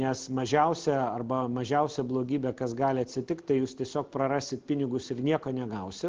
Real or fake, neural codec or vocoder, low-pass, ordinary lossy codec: real; none; 7.2 kHz; Opus, 16 kbps